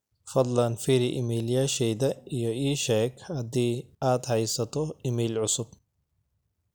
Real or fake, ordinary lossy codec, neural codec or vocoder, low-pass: real; none; none; none